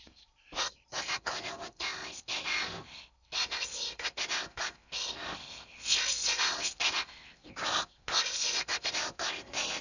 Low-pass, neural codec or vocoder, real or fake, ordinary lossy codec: 7.2 kHz; codec, 16 kHz in and 24 kHz out, 0.6 kbps, FocalCodec, streaming, 4096 codes; fake; none